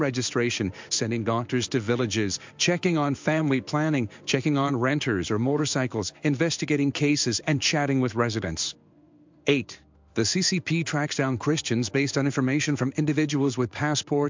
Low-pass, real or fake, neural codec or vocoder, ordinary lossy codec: 7.2 kHz; fake; codec, 16 kHz in and 24 kHz out, 1 kbps, XY-Tokenizer; MP3, 64 kbps